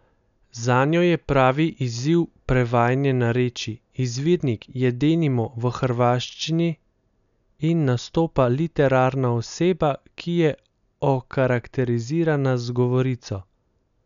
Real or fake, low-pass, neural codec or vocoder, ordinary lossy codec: real; 7.2 kHz; none; none